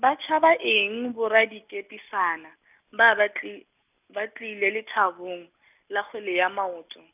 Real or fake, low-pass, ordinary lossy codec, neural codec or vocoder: real; 3.6 kHz; none; none